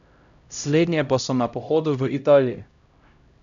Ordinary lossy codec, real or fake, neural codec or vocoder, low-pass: none; fake; codec, 16 kHz, 0.5 kbps, X-Codec, HuBERT features, trained on LibriSpeech; 7.2 kHz